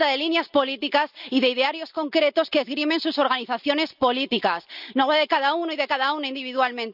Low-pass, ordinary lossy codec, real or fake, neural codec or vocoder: 5.4 kHz; none; real; none